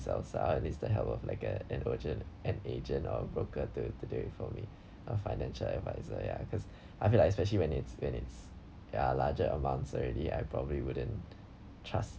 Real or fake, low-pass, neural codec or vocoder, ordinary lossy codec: real; none; none; none